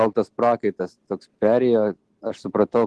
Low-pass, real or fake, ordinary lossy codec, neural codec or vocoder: 10.8 kHz; real; Opus, 16 kbps; none